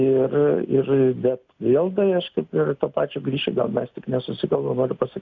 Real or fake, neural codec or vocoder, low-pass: real; none; 7.2 kHz